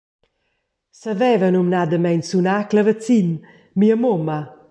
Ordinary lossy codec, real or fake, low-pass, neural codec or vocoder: AAC, 64 kbps; real; 9.9 kHz; none